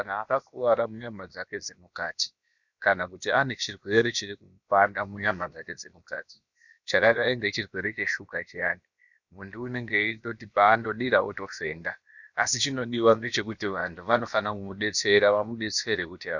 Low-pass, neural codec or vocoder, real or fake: 7.2 kHz; codec, 16 kHz, about 1 kbps, DyCAST, with the encoder's durations; fake